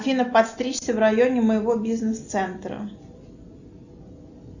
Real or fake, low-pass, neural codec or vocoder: real; 7.2 kHz; none